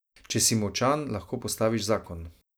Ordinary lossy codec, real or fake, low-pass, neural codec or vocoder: none; real; none; none